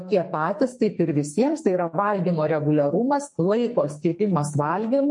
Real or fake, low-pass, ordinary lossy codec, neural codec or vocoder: fake; 10.8 kHz; MP3, 48 kbps; codec, 44.1 kHz, 2.6 kbps, SNAC